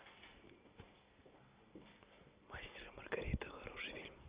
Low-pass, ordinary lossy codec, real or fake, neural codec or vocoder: 3.6 kHz; Opus, 64 kbps; real; none